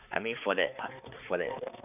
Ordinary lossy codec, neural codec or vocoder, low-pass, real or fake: none; codec, 16 kHz, 4 kbps, X-Codec, HuBERT features, trained on balanced general audio; 3.6 kHz; fake